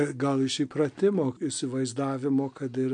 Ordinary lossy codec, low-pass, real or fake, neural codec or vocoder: MP3, 64 kbps; 9.9 kHz; real; none